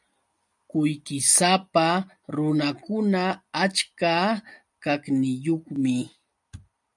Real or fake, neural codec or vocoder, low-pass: real; none; 10.8 kHz